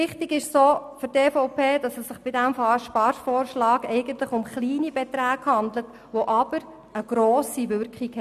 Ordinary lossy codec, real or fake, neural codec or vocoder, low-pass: none; real; none; 14.4 kHz